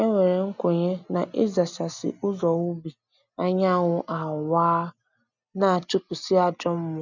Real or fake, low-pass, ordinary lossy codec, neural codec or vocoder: real; 7.2 kHz; none; none